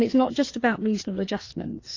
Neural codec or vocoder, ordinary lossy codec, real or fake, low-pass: codec, 16 kHz, 2 kbps, FreqCodec, larger model; AAC, 32 kbps; fake; 7.2 kHz